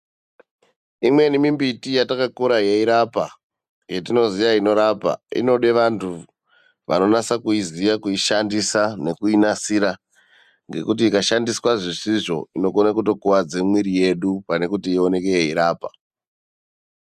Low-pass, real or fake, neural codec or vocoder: 14.4 kHz; real; none